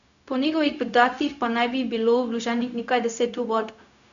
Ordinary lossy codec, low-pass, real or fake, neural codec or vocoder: none; 7.2 kHz; fake; codec, 16 kHz, 0.4 kbps, LongCat-Audio-Codec